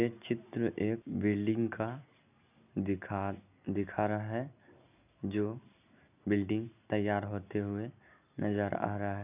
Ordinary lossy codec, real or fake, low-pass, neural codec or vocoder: none; real; 3.6 kHz; none